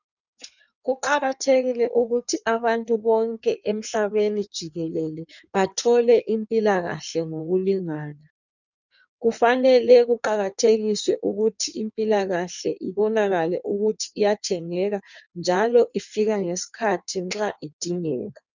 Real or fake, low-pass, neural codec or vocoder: fake; 7.2 kHz; codec, 16 kHz in and 24 kHz out, 1.1 kbps, FireRedTTS-2 codec